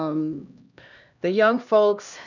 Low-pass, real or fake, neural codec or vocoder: 7.2 kHz; fake; codec, 16 kHz, 1 kbps, X-Codec, HuBERT features, trained on LibriSpeech